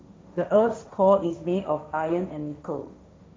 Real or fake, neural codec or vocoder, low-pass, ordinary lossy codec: fake; codec, 16 kHz, 1.1 kbps, Voila-Tokenizer; none; none